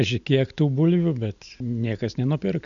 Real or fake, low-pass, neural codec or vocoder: real; 7.2 kHz; none